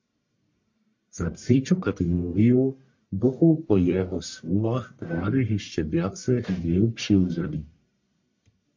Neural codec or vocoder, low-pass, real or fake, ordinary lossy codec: codec, 44.1 kHz, 1.7 kbps, Pupu-Codec; 7.2 kHz; fake; MP3, 48 kbps